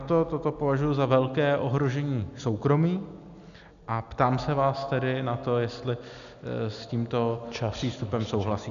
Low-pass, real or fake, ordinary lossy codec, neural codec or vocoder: 7.2 kHz; real; MP3, 96 kbps; none